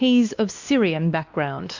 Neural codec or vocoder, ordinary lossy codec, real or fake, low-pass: codec, 16 kHz, 2 kbps, X-Codec, WavLM features, trained on Multilingual LibriSpeech; Opus, 64 kbps; fake; 7.2 kHz